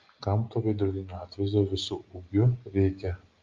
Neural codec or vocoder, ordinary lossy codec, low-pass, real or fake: none; Opus, 32 kbps; 7.2 kHz; real